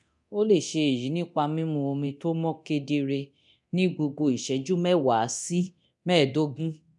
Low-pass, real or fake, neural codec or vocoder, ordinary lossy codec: 10.8 kHz; fake; codec, 24 kHz, 0.9 kbps, DualCodec; none